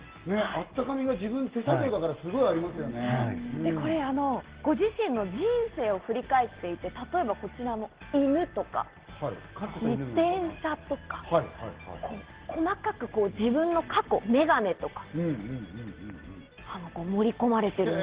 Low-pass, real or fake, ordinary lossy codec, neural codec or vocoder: 3.6 kHz; real; Opus, 16 kbps; none